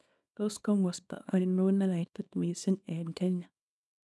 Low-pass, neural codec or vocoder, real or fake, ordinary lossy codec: none; codec, 24 kHz, 0.9 kbps, WavTokenizer, small release; fake; none